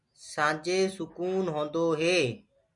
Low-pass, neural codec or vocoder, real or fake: 10.8 kHz; none; real